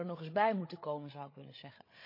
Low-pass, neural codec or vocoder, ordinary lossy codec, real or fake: 5.4 kHz; codec, 16 kHz, 8 kbps, FreqCodec, larger model; MP3, 32 kbps; fake